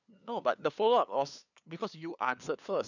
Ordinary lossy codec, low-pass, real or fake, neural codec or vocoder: none; 7.2 kHz; fake; codec, 16 kHz, 2 kbps, FunCodec, trained on LibriTTS, 25 frames a second